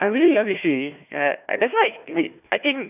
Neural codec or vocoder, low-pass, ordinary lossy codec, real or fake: codec, 16 kHz, 1 kbps, FunCodec, trained on Chinese and English, 50 frames a second; 3.6 kHz; none; fake